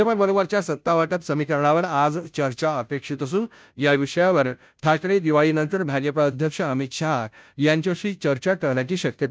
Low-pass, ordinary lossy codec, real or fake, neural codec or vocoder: none; none; fake; codec, 16 kHz, 0.5 kbps, FunCodec, trained on Chinese and English, 25 frames a second